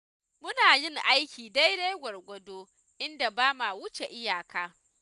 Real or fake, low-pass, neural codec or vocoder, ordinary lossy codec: real; 10.8 kHz; none; none